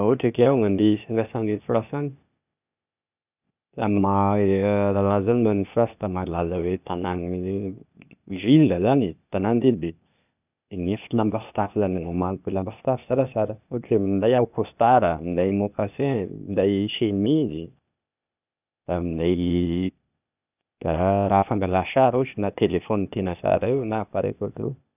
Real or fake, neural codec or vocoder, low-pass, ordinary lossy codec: fake; codec, 16 kHz, 0.8 kbps, ZipCodec; 3.6 kHz; none